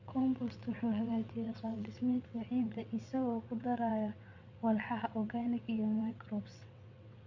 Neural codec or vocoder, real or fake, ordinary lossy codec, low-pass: vocoder, 22.05 kHz, 80 mel bands, WaveNeXt; fake; MP3, 64 kbps; 7.2 kHz